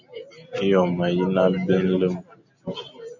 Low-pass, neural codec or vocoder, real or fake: 7.2 kHz; none; real